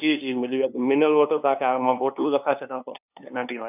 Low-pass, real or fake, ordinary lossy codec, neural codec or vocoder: 3.6 kHz; fake; none; codec, 16 kHz, 2 kbps, FunCodec, trained on LibriTTS, 25 frames a second